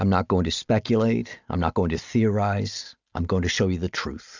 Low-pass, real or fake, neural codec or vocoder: 7.2 kHz; real; none